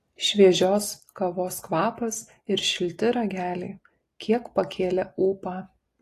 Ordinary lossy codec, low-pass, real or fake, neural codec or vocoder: AAC, 48 kbps; 14.4 kHz; real; none